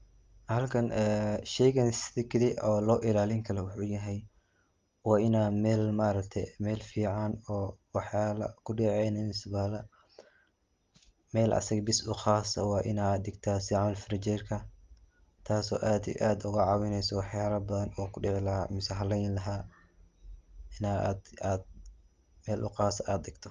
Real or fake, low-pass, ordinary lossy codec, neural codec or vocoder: real; 7.2 kHz; Opus, 32 kbps; none